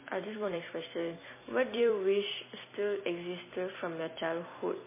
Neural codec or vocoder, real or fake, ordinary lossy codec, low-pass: vocoder, 44.1 kHz, 128 mel bands every 256 samples, BigVGAN v2; fake; MP3, 16 kbps; 3.6 kHz